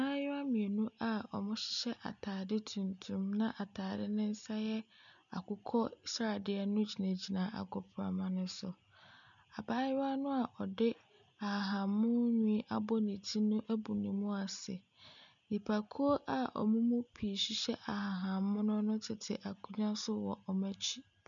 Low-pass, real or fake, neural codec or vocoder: 7.2 kHz; real; none